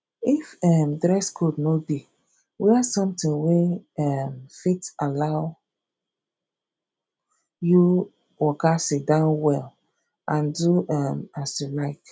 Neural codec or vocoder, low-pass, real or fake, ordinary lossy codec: none; none; real; none